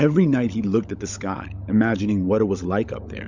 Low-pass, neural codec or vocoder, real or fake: 7.2 kHz; codec, 16 kHz, 16 kbps, FunCodec, trained on LibriTTS, 50 frames a second; fake